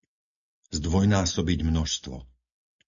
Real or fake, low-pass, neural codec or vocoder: real; 7.2 kHz; none